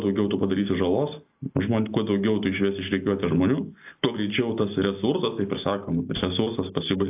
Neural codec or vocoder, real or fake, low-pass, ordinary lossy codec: none; real; 3.6 kHz; AAC, 32 kbps